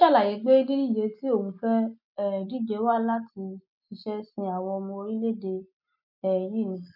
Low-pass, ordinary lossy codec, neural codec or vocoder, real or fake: 5.4 kHz; none; none; real